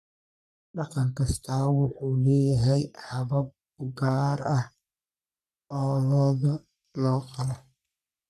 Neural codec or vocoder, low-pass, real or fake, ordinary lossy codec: codec, 44.1 kHz, 3.4 kbps, Pupu-Codec; 14.4 kHz; fake; none